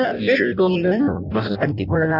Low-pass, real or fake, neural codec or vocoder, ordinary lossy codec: 5.4 kHz; fake; codec, 16 kHz in and 24 kHz out, 0.6 kbps, FireRedTTS-2 codec; none